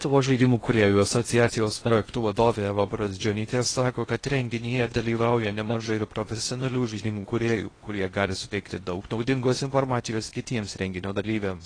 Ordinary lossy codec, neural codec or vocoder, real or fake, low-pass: AAC, 32 kbps; codec, 16 kHz in and 24 kHz out, 0.6 kbps, FocalCodec, streaming, 2048 codes; fake; 9.9 kHz